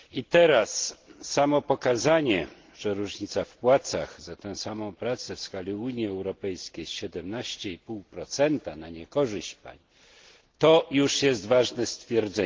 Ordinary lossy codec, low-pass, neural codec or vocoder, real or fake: Opus, 16 kbps; 7.2 kHz; none; real